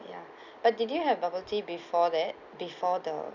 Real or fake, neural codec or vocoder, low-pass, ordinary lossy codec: real; none; 7.2 kHz; none